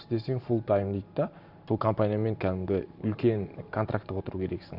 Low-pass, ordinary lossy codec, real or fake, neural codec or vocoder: 5.4 kHz; none; fake; vocoder, 44.1 kHz, 128 mel bands every 512 samples, BigVGAN v2